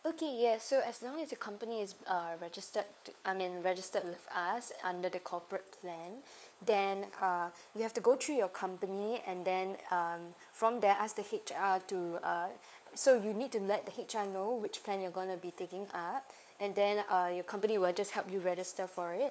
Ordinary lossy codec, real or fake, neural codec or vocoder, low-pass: none; fake; codec, 16 kHz, 4 kbps, FunCodec, trained on LibriTTS, 50 frames a second; none